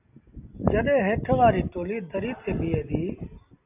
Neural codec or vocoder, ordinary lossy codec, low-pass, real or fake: none; AAC, 32 kbps; 3.6 kHz; real